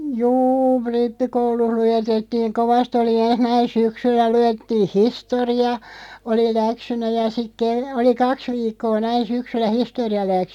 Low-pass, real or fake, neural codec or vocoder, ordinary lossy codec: 19.8 kHz; real; none; none